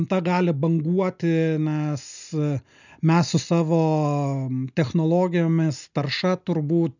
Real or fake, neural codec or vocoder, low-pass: real; none; 7.2 kHz